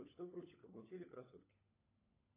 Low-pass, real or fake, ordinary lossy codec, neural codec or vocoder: 3.6 kHz; fake; AAC, 16 kbps; codec, 16 kHz, 16 kbps, FunCodec, trained on LibriTTS, 50 frames a second